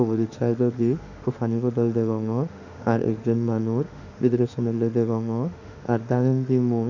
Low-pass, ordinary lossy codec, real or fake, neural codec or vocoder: 7.2 kHz; none; fake; autoencoder, 48 kHz, 32 numbers a frame, DAC-VAE, trained on Japanese speech